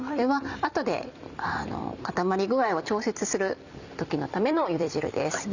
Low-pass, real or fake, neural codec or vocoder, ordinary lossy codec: 7.2 kHz; fake; vocoder, 44.1 kHz, 128 mel bands every 256 samples, BigVGAN v2; none